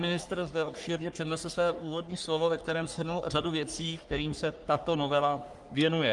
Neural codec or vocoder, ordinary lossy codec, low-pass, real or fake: codec, 44.1 kHz, 3.4 kbps, Pupu-Codec; Opus, 32 kbps; 10.8 kHz; fake